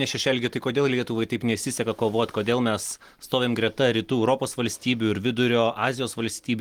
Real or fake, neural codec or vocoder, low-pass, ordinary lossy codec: real; none; 19.8 kHz; Opus, 24 kbps